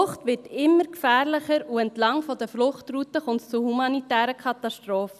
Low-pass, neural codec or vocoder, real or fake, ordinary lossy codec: 14.4 kHz; none; real; none